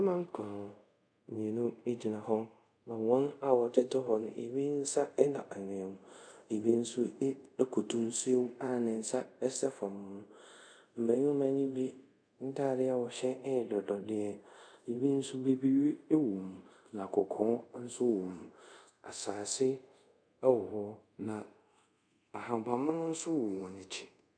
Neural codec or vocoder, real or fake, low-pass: codec, 24 kHz, 0.5 kbps, DualCodec; fake; 9.9 kHz